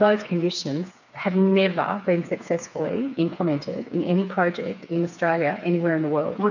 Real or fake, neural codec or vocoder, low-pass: fake; codec, 16 kHz, 4 kbps, FreqCodec, smaller model; 7.2 kHz